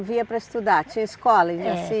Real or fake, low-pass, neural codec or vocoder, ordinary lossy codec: real; none; none; none